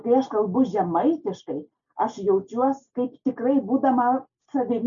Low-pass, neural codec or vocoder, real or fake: 7.2 kHz; none; real